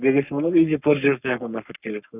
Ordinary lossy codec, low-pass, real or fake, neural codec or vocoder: none; 3.6 kHz; fake; codec, 44.1 kHz, 3.4 kbps, Pupu-Codec